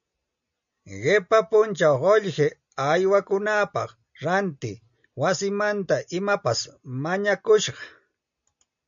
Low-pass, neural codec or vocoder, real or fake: 7.2 kHz; none; real